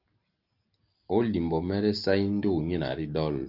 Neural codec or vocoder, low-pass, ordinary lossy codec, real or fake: none; 5.4 kHz; Opus, 24 kbps; real